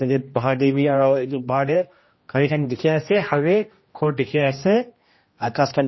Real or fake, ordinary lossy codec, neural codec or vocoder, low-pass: fake; MP3, 24 kbps; codec, 16 kHz, 1 kbps, X-Codec, HuBERT features, trained on general audio; 7.2 kHz